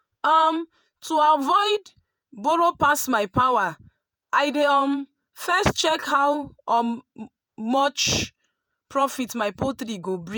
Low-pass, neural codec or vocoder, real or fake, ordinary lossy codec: none; vocoder, 48 kHz, 128 mel bands, Vocos; fake; none